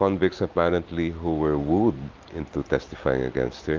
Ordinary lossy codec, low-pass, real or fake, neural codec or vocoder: Opus, 24 kbps; 7.2 kHz; real; none